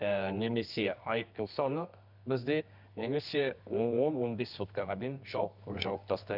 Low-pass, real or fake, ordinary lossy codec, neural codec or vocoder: 5.4 kHz; fake; none; codec, 24 kHz, 0.9 kbps, WavTokenizer, medium music audio release